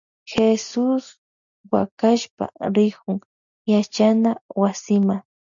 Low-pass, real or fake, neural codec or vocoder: 7.2 kHz; real; none